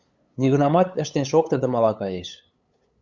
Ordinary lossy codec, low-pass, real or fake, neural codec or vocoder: Opus, 64 kbps; 7.2 kHz; fake; codec, 16 kHz, 8 kbps, FunCodec, trained on LibriTTS, 25 frames a second